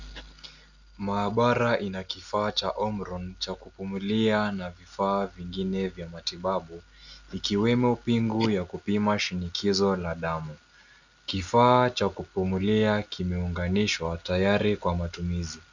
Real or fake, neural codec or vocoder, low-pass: real; none; 7.2 kHz